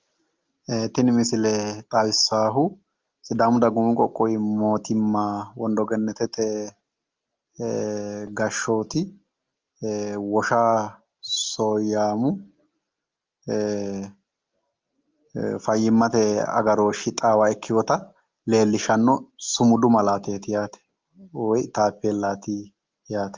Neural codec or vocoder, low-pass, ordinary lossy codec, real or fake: none; 7.2 kHz; Opus, 24 kbps; real